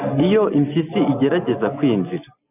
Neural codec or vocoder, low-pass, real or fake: none; 3.6 kHz; real